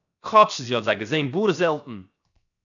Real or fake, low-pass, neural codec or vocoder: fake; 7.2 kHz; codec, 16 kHz, 0.7 kbps, FocalCodec